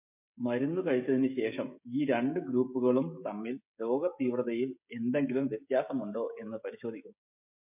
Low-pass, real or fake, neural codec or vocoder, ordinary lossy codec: 3.6 kHz; fake; codec, 16 kHz, 16 kbps, FreqCodec, smaller model; MP3, 32 kbps